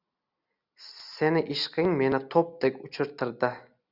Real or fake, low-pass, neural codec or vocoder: real; 5.4 kHz; none